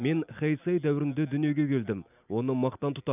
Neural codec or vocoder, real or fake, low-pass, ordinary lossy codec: none; real; 3.6 kHz; none